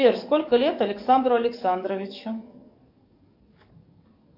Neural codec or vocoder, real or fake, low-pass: codec, 44.1 kHz, 7.8 kbps, DAC; fake; 5.4 kHz